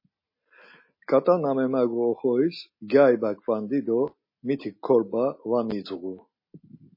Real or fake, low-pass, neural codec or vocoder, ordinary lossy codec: real; 5.4 kHz; none; MP3, 24 kbps